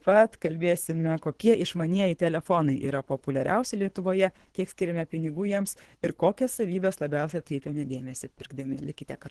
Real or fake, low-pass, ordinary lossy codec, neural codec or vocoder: fake; 10.8 kHz; Opus, 16 kbps; codec, 24 kHz, 3 kbps, HILCodec